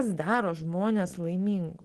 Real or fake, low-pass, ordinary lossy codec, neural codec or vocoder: real; 10.8 kHz; Opus, 16 kbps; none